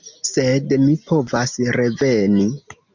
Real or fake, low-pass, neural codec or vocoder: real; 7.2 kHz; none